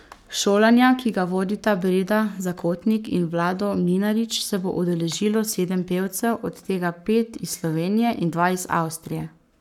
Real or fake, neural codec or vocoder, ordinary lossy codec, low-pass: fake; codec, 44.1 kHz, 7.8 kbps, Pupu-Codec; none; 19.8 kHz